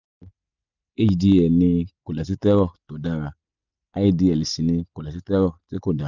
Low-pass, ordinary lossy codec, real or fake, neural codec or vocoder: 7.2 kHz; none; real; none